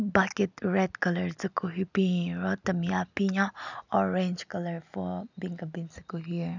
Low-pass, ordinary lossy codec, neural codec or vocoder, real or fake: 7.2 kHz; none; none; real